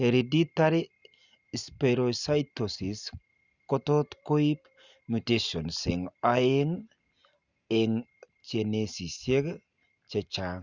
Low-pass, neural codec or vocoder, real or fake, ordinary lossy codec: 7.2 kHz; none; real; Opus, 64 kbps